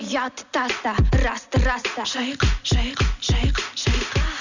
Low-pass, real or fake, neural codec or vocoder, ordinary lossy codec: 7.2 kHz; real; none; none